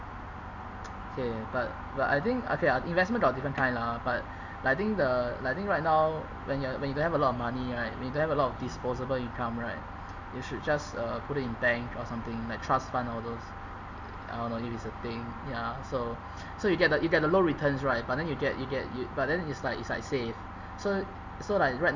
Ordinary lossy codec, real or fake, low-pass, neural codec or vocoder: none; real; 7.2 kHz; none